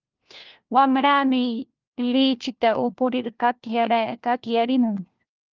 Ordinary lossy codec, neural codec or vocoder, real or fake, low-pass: Opus, 24 kbps; codec, 16 kHz, 1 kbps, FunCodec, trained on LibriTTS, 50 frames a second; fake; 7.2 kHz